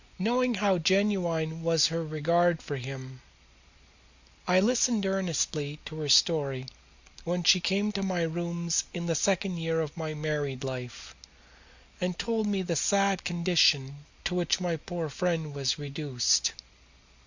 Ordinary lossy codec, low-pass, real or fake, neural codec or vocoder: Opus, 64 kbps; 7.2 kHz; real; none